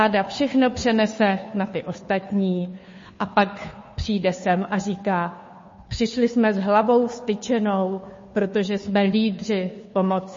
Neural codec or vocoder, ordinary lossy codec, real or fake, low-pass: codec, 16 kHz, 2 kbps, FunCodec, trained on Chinese and English, 25 frames a second; MP3, 32 kbps; fake; 7.2 kHz